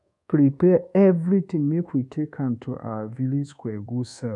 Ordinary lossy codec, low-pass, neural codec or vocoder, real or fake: none; 10.8 kHz; codec, 24 kHz, 1.2 kbps, DualCodec; fake